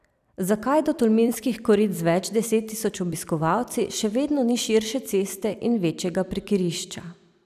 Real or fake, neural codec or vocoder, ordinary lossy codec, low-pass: fake; vocoder, 44.1 kHz, 128 mel bands every 256 samples, BigVGAN v2; none; 14.4 kHz